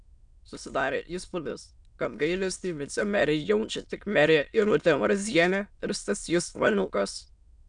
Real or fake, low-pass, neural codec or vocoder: fake; 9.9 kHz; autoencoder, 22.05 kHz, a latent of 192 numbers a frame, VITS, trained on many speakers